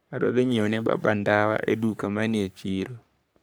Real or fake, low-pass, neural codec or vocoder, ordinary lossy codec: fake; none; codec, 44.1 kHz, 3.4 kbps, Pupu-Codec; none